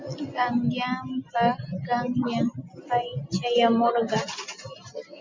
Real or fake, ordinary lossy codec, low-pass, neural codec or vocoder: real; MP3, 64 kbps; 7.2 kHz; none